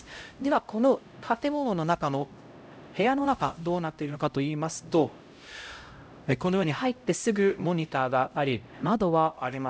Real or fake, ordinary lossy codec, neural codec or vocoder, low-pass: fake; none; codec, 16 kHz, 0.5 kbps, X-Codec, HuBERT features, trained on LibriSpeech; none